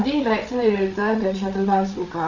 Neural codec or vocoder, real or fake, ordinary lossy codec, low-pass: codec, 16 kHz, 8 kbps, FreqCodec, larger model; fake; none; 7.2 kHz